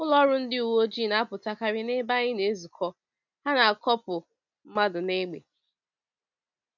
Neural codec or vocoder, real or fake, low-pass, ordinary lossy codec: none; real; 7.2 kHz; none